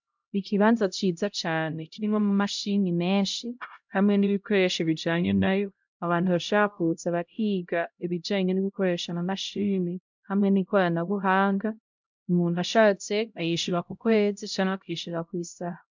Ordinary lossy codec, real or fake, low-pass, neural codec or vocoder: MP3, 64 kbps; fake; 7.2 kHz; codec, 16 kHz, 0.5 kbps, X-Codec, HuBERT features, trained on LibriSpeech